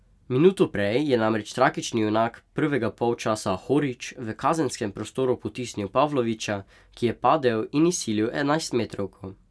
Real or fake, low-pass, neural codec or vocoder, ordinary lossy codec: real; none; none; none